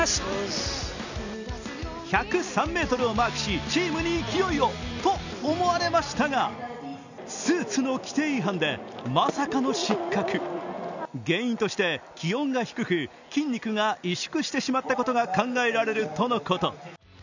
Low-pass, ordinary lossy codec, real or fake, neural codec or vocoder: 7.2 kHz; none; real; none